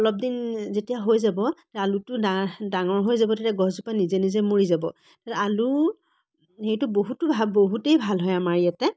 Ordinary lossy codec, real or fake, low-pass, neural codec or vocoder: none; real; none; none